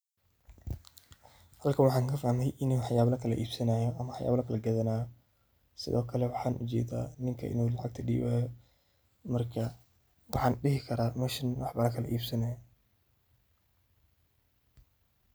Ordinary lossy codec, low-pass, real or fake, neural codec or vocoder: none; none; real; none